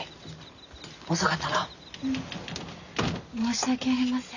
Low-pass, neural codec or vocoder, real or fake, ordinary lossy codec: 7.2 kHz; none; real; none